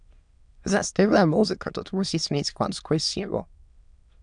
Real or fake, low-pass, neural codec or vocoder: fake; 9.9 kHz; autoencoder, 22.05 kHz, a latent of 192 numbers a frame, VITS, trained on many speakers